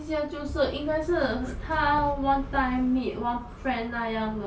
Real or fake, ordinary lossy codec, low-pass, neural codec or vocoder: real; none; none; none